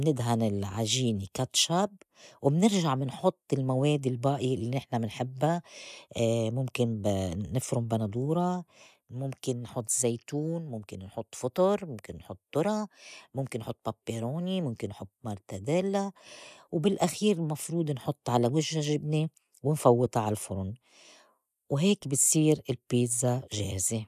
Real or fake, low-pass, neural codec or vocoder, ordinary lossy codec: real; 14.4 kHz; none; none